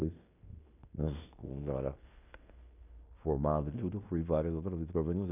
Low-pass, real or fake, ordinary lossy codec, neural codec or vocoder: 3.6 kHz; fake; none; codec, 16 kHz in and 24 kHz out, 0.9 kbps, LongCat-Audio-Codec, fine tuned four codebook decoder